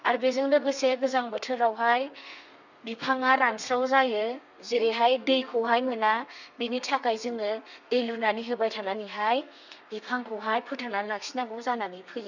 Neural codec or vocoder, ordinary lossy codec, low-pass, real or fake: codec, 32 kHz, 1.9 kbps, SNAC; none; 7.2 kHz; fake